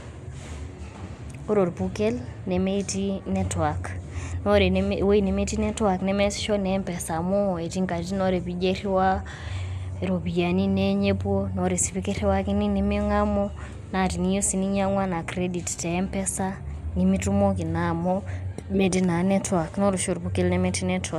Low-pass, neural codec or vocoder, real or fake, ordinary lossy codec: 14.4 kHz; none; real; MP3, 96 kbps